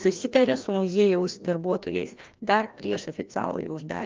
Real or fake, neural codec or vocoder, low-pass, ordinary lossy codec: fake; codec, 16 kHz, 1 kbps, FreqCodec, larger model; 7.2 kHz; Opus, 24 kbps